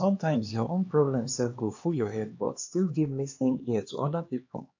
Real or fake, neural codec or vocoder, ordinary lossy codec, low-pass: fake; codec, 16 kHz, 2 kbps, X-Codec, HuBERT features, trained on LibriSpeech; AAC, 48 kbps; 7.2 kHz